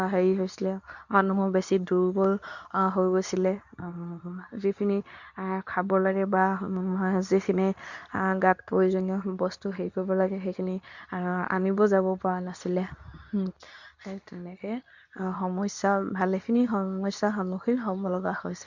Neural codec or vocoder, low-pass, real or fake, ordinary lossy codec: codec, 24 kHz, 0.9 kbps, WavTokenizer, medium speech release version 2; 7.2 kHz; fake; none